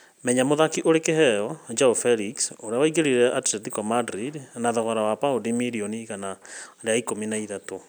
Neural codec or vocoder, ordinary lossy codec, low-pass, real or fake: none; none; none; real